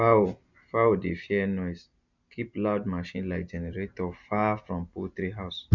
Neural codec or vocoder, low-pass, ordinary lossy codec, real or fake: none; 7.2 kHz; none; real